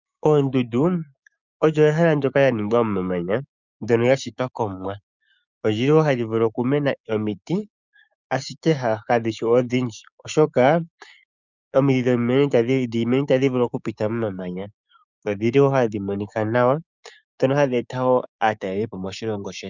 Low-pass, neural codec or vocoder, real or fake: 7.2 kHz; codec, 16 kHz, 6 kbps, DAC; fake